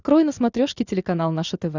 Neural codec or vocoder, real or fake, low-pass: none; real; 7.2 kHz